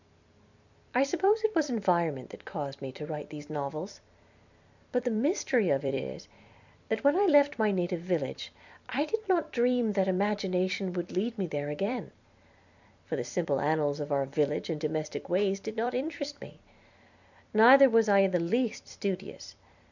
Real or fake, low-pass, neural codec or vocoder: fake; 7.2 kHz; vocoder, 44.1 kHz, 128 mel bands every 256 samples, BigVGAN v2